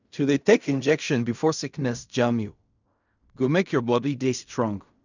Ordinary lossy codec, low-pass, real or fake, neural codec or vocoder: none; 7.2 kHz; fake; codec, 16 kHz in and 24 kHz out, 0.4 kbps, LongCat-Audio-Codec, fine tuned four codebook decoder